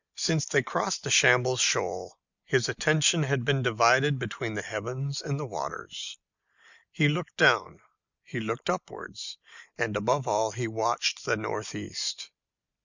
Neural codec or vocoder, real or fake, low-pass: none; real; 7.2 kHz